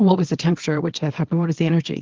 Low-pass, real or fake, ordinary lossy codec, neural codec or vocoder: 7.2 kHz; fake; Opus, 16 kbps; codec, 24 kHz, 0.9 kbps, WavTokenizer, small release